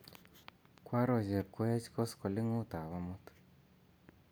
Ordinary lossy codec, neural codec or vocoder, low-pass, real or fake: none; none; none; real